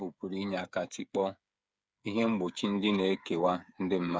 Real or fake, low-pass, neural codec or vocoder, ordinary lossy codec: fake; none; codec, 16 kHz, 8 kbps, FreqCodec, smaller model; none